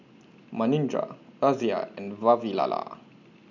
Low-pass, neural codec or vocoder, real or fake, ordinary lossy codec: 7.2 kHz; none; real; none